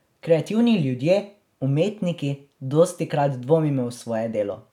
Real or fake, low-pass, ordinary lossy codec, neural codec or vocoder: real; 19.8 kHz; none; none